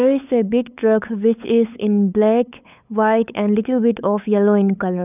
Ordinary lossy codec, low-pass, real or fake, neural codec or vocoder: none; 3.6 kHz; fake; codec, 16 kHz, 8 kbps, FunCodec, trained on LibriTTS, 25 frames a second